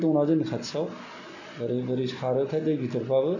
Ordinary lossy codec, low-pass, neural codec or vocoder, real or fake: none; 7.2 kHz; none; real